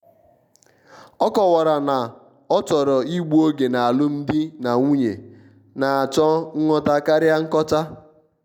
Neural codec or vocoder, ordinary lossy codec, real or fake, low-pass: none; none; real; 19.8 kHz